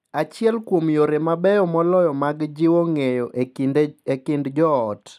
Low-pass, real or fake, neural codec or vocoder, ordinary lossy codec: 14.4 kHz; real; none; none